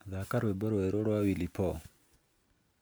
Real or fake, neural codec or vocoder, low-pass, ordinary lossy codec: real; none; none; none